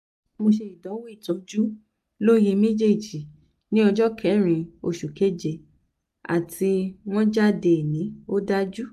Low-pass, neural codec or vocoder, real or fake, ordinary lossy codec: 14.4 kHz; none; real; AAC, 96 kbps